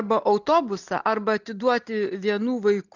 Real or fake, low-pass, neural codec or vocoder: real; 7.2 kHz; none